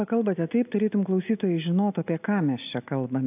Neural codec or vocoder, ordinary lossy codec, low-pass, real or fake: none; AAC, 32 kbps; 3.6 kHz; real